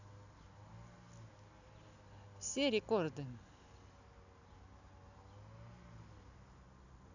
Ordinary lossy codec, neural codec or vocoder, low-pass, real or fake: none; none; 7.2 kHz; real